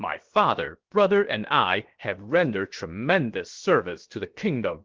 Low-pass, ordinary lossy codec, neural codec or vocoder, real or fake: 7.2 kHz; Opus, 16 kbps; codec, 16 kHz, 0.7 kbps, FocalCodec; fake